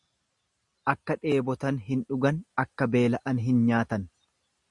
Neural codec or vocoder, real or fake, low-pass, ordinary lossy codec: none; real; 10.8 kHz; Opus, 64 kbps